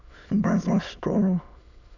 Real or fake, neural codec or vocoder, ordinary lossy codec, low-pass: fake; autoencoder, 22.05 kHz, a latent of 192 numbers a frame, VITS, trained on many speakers; none; 7.2 kHz